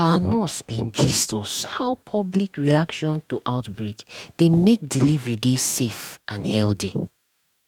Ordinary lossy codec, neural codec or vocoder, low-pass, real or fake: none; codec, 44.1 kHz, 2.6 kbps, DAC; 19.8 kHz; fake